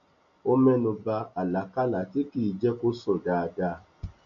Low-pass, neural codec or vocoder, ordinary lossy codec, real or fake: 7.2 kHz; none; MP3, 48 kbps; real